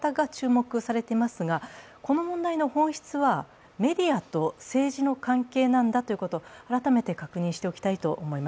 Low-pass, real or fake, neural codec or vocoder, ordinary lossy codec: none; real; none; none